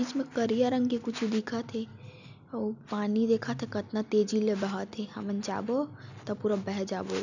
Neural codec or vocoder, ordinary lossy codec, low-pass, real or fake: none; none; 7.2 kHz; real